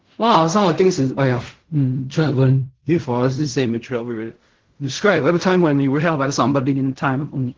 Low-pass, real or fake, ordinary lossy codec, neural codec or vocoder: 7.2 kHz; fake; Opus, 16 kbps; codec, 16 kHz in and 24 kHz out, 0.4 kbps, LongCat-Audio-Codec, fine tuned four codebook decoder